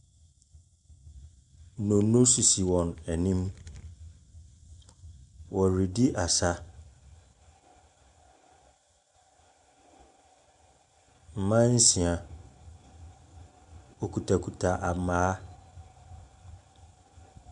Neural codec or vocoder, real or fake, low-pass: none; real; 10.8 kHz